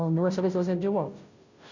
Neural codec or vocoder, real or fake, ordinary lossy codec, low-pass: codec, 16 kHz, 0.5 kbps, FunCodec, trained on Chinese and English, 25 frames a second; fake; none; 7.2 kHz